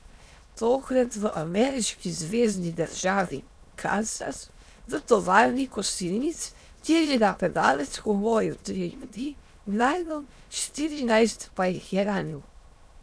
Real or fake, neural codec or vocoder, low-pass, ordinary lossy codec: fake; autoencoder, 22.05 kHz, a latent of 192 numbers a frame, VITS, trained on many speakers; none; none